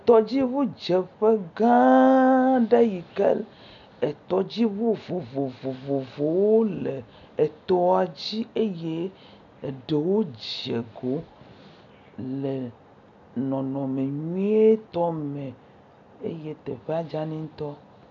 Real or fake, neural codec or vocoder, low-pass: real; none; 7.2 kHz